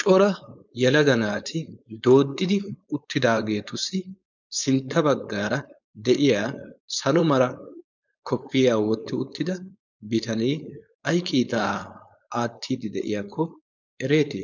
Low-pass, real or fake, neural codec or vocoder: 7.2 kHz; fake; codec, 16 kHz, 4.8 kbps, FACodec